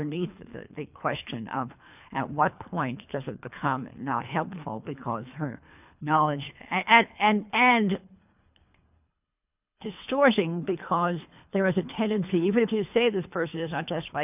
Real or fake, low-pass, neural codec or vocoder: fake; 3.6 kHz; codec, 24 kHz, 3 kbps, HILCodec